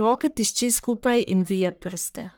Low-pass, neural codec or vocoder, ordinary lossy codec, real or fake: none; codec, 44.1 kHz, 1.7 kbps, Pupu-Codec; none; fake